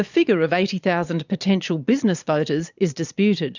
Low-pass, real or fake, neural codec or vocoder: 7.2 kHz; fake; vocoder, 22.05 kHz, 80 mel bands, Vocos